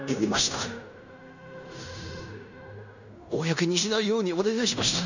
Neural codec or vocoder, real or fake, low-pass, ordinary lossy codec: codec, 16 kHz in and 24 kHz out, 0.9 kbps, LongCat-Audio-Codec, fine tuned four codebook decoder; fake; 7.2 kHz; none